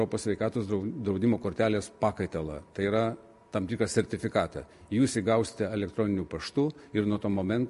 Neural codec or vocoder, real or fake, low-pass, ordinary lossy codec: none; real; 14.4 kHz; MP3, 48 kbps